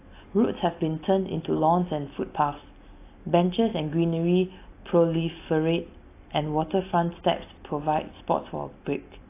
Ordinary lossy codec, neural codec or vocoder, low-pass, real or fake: AAC, 24 kbps; none; 3.6 kHz; real